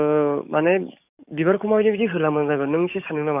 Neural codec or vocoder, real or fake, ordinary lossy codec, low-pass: codec, 44.1 kHz, 7.8 kbps, DAC; fake; none; 3.6 kHz